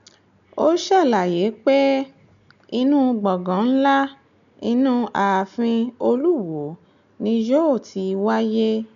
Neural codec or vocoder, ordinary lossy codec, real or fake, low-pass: none; none; real; 7.2 kHz